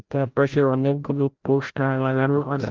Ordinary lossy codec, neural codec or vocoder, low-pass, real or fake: Opus, 24 kbps; codec, 16 kHz, 0.5 kbps, FreqCodec, larger model; 7.2 kHz; fake